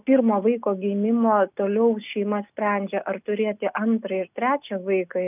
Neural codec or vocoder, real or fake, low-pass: none; real; 3.6 kHz